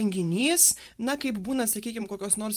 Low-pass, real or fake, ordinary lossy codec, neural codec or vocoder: 14.4 kHz; fake; Opus, 32 kbps; vocoder, 44.1 kHz, 128 mel bands every 512 samples, BigVGAN v2